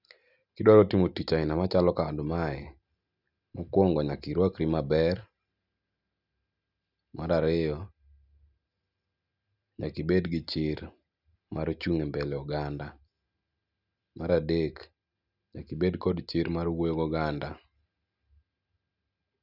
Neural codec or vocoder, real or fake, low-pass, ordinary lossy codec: none; real; 5.4 kHz; none